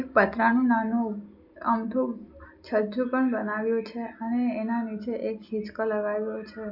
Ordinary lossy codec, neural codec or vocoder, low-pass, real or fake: none; none; 5.4 kHz; real